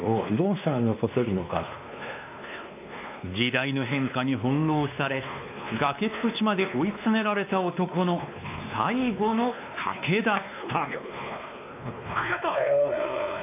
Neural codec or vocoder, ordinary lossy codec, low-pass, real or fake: codec, 16 kHz, 2 kbps, X-Codec, WavLM features, trained on Multilingual LibriSpeech; AAC, 32 kbps; 3.6 kHz; fake